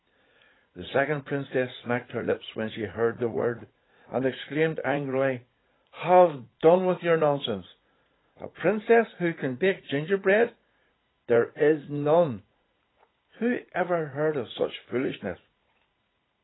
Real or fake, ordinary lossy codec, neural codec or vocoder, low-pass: fake; AAC, 16 kbps; vocoder, 44.1 kHz, 80 mel bands, Vocos; 7.2 kHz